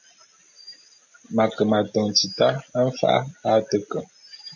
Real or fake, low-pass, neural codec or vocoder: real; 7.2 kHz; none